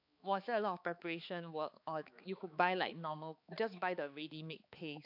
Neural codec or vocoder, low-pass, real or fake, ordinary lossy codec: codec, 16 kHz, 4 kbps, X-Codec, HuBERT features, trained on balanced general audio; 5.4 kHz; fake; AAC, 48 kbps